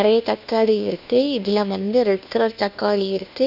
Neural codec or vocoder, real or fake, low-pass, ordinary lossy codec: codec, 16 kHz, 1 kbps, FunCodec, trained on LibriTTS, 50 frames a second; fake; 5.4 kHz; none